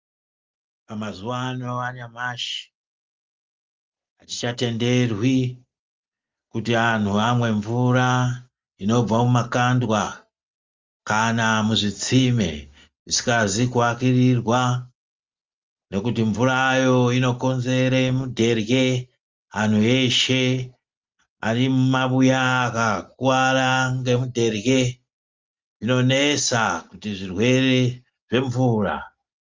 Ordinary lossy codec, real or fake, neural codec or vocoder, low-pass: Opus, 32 kbps; real; none; 7.2 kHz